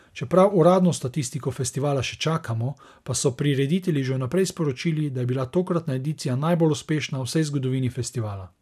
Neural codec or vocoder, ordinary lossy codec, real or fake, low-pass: none; none; real; 14.4 kHz